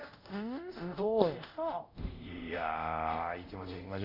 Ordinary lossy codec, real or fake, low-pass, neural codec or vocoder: none; fake; 5.4 kHz; codec, 24 kHz, 0.5 kbps, DualCodec